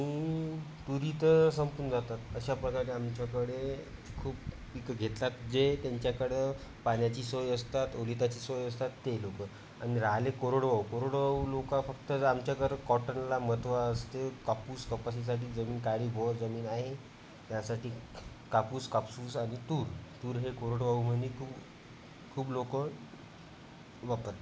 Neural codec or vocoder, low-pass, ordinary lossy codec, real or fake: none; none; none; real